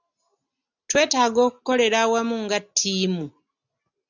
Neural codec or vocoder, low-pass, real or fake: none; 7.2 kHz; real